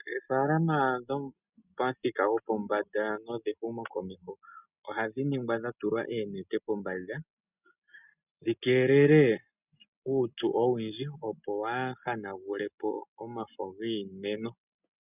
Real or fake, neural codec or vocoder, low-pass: real; none; 3.6 kHz